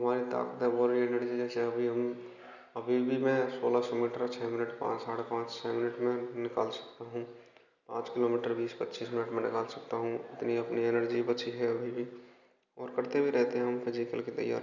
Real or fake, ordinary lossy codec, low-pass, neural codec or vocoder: real; none; 7.2 kHz; none